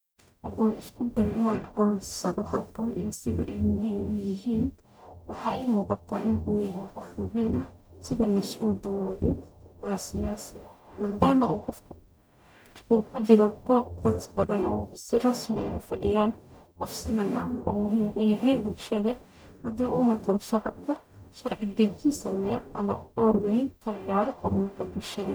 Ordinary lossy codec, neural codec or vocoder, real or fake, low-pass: none; codec, 44.1 kHz, 0.9 kbps, DAC; fake; none